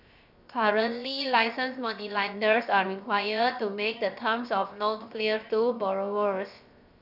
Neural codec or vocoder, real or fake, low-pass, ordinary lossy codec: codec, 16 kHz, 0.7 kbps, FocalCodec; fake; 5.4 kHz; none